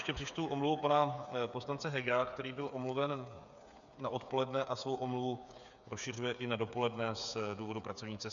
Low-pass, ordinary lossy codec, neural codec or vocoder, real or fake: 7.2 kHz; MP3, 96 kbps; codec, 16 kHz, 8 kbps, FreqCodec, smaller model; fake